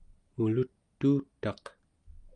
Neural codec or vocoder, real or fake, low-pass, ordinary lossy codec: none; real; 9.9 kHz; Opus, 32 kbps